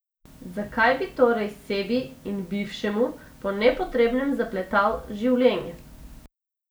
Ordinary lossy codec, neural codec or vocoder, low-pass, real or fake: none; none; none; real